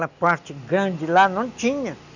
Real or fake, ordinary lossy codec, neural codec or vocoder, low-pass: real; none; none; 7.2 kHz